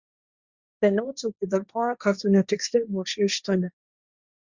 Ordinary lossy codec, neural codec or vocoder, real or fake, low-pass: Opus, 64 kbps; codec, 16 kHz, 1.1 kbps, Voila-Tokenizer; fake; 7.2 kHz